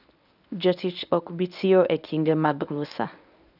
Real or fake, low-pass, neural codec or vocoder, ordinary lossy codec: fake; 5.4 kHz; codec, 24 kHz, 0.9 kbps, WavTokenizer, medium speech release version 1; none